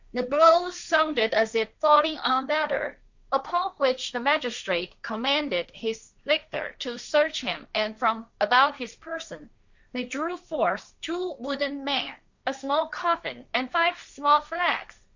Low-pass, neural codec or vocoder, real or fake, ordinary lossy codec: 7.2 kHz; codec, 16 kHz, 1.1 kbps, Voila-Tokenizer; fake; Opus, 64 kbps